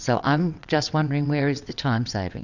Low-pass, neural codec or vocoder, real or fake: 7.2 kHz; vocoder, 22.05 kHz, 80 mel bands, WaveNeXt; fake